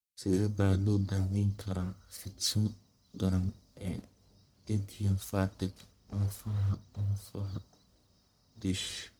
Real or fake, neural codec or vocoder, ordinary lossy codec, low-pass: fake; codec, 44.1 kHz, 1.7 kbps, Pupu-Codec; none; none